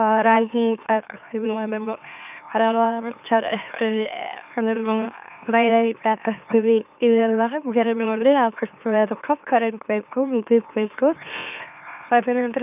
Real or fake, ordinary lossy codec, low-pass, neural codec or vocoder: fake; none; 3.6 kHz; autoencoder, 44.1 kHz, a latent of 192 numbers a frame, MeloTTS